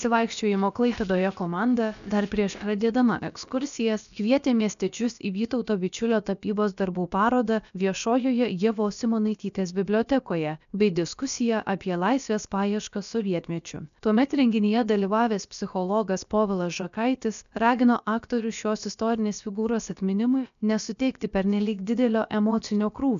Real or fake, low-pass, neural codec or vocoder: fake; 7.2 kHz; codec, 16 kHz, about 1 kbps, DyCAST, with the encoder's durations